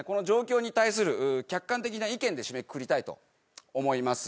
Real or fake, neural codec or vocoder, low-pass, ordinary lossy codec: real; none; none; none